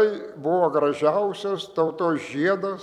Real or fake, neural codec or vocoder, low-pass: fake; vocoder, 44.1 kHz, 128 mel bands every 256 samples, BigVGAN v2; 19.8 kHz